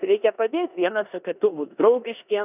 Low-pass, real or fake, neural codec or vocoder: 3.6 kHz; fake; codec, 16 kHz in and 24 kHz out, 0.9 kbps, LongCat-Audio-Codec, four codebook decoder